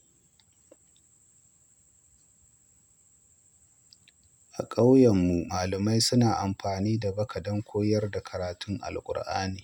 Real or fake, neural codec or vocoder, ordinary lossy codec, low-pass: real; none; none; 19.8 kHz